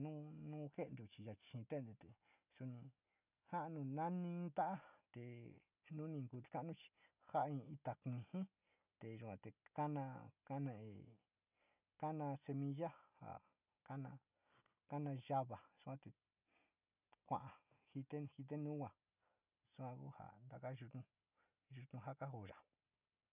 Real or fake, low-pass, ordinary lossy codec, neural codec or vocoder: real; 3.6 kHz; none; none